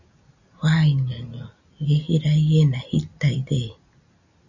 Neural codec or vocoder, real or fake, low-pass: none; real; 7.2 kHz